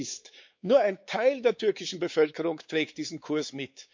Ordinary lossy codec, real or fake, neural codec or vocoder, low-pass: MP3, 64 kbps; fake; codec, 16 kHz, 4 kbps, X-Codec, WavLM features, trained on Multilingual LibriSpeech; 7.2 kHz